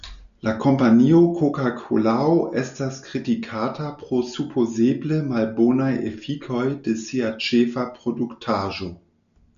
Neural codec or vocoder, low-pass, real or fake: none; 7.2 kHz; real